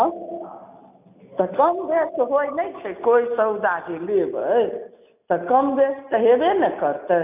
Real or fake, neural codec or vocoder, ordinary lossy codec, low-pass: real; none; none; 3.6 kHz